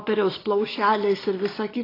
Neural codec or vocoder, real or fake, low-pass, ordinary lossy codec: none; real; 5.4 kHz; AAC, 24 kbps